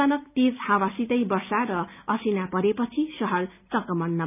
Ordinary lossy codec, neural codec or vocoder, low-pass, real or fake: none; none; 3.6 kHz; real